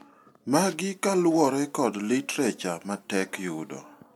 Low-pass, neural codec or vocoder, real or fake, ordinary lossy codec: 19.8 kHz; none; real; MP3, 96 kbps